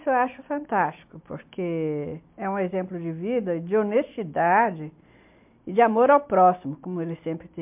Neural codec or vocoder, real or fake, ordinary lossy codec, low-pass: none; real; MP3, 32 kbps; 3.6 kHz